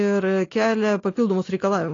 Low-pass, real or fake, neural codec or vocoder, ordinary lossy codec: 7.2 kHz; real; none; AAC, 32 kbps